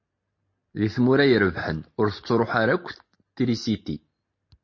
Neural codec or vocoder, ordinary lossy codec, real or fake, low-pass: none; MP3, 32 kbps; real; 7.2 kHz